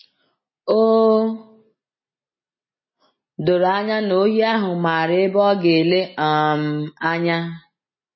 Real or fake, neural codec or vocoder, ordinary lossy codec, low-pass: real; none; MP3, 24 kbps; 7.2 kHz